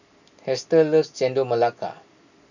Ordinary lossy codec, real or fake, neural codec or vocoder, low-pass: none; real; none; 7.2 kHz